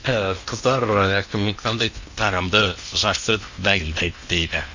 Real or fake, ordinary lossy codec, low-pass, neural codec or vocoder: fake; Opus, 64 kbps; 7.2 kHz; codec, 16 kHz in and 24 kHz out, 0.8 kbps, FocalCodec, streaming, 65536 codes